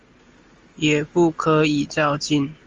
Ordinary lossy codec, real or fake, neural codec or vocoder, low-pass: Opus, 32 kbps; real; none; 7.2 kHz